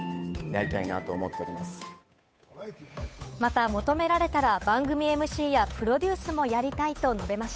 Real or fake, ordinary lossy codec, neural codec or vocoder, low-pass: fake; none; codec, 16 kHz, 8 kbps, FunCodec, trained on Chinese and English, 25 frames a second; none